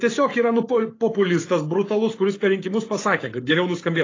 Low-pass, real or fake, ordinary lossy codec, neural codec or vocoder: 7.2 kHz; fake; AAC, 32 kbps; codec, 44.1 kHz, 7.8 kbps, Pupu-Codec